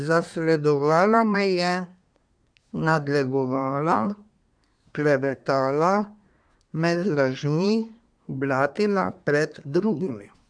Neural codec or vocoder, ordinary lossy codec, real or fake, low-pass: codec, 24 kHz, 1 kbps, SNAC; none; fake; 9.9 kHz